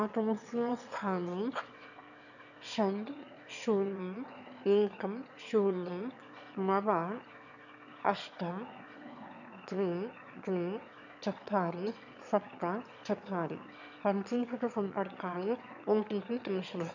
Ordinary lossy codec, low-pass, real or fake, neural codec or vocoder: none; 7.2 kHz; fake; autoencoder, 22.05 kHz, a latent of 192 numbers a frame, VITS, trained on one speaker